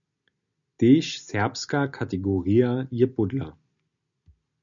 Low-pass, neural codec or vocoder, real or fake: 7.2 kHz; none; real